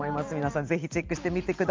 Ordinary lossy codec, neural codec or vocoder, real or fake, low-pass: Opus, 24 kbps; none; real; 7.2 kHz